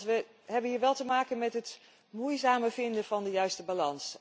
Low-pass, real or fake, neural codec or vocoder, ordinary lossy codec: none; real; none; none